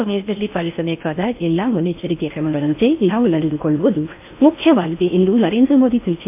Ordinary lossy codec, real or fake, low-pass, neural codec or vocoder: MP3, 32 kbps; fake; 3.6 kHz; codec, 16 kHz in and 24 kHz out, 0.6 kbps, FocalCodec, streaming, 4096 codes